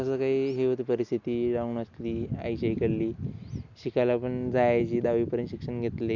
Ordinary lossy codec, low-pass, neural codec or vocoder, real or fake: Opus, 64 kbps; 7.2 kHz; none; real